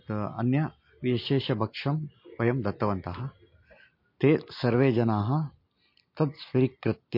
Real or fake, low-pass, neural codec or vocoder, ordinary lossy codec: real; 5.4 kHz; none; MP3, 32 kbps